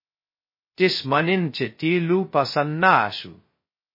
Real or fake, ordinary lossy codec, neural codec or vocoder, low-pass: fake; MP3, 24 kbps; codec, 16 kHz, 0.2 kbps, FocalCodec; 5.4 kHz